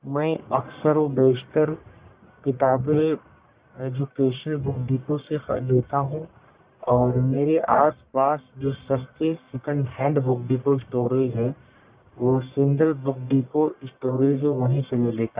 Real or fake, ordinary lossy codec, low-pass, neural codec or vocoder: fake; Opus, 64 kbps; 3.6 kHz; codec, 44.1 kHz, 1.7 kbps, Pupu-Codec